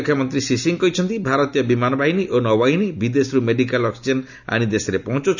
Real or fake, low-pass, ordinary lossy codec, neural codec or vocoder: real; 7.2 kHz; none; none